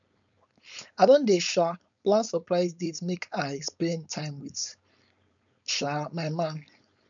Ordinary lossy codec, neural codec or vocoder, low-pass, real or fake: none; codec, 16 kHz, 4.8 kbps, FACodec; 7.2 kHz; fake